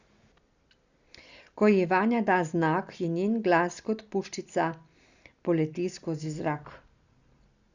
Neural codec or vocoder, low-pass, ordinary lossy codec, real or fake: none; 7.2 kHz; Opus, 64 kbps; real